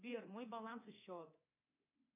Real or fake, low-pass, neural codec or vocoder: fake; 3.6 kHz; codec, 24 kHz, 3.1 kbps, DualCodec